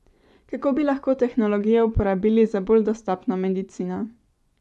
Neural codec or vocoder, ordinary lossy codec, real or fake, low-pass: vocoder, 24 kHz, 100 mel bands, Vocos; none; fake; none